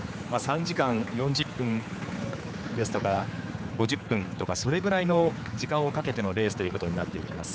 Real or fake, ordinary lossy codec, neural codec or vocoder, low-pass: fake; none; codec, 16 kHz, 4 kbps, X-Codec, HuBERT features, trained on general audio; none